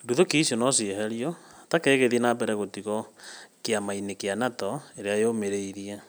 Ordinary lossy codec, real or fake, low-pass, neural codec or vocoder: none; real; none; none